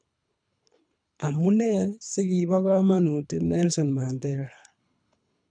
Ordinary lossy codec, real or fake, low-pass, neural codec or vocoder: none; fake; 9.9 kHz; codec, 24 kHz, 3 kbps, HILCodec